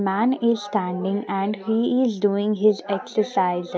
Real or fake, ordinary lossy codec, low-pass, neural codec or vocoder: real; none; none; none